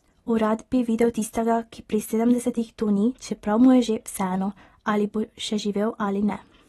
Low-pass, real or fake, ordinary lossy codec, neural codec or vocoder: 14.4 kHz; real; AAC, 32 kbps; none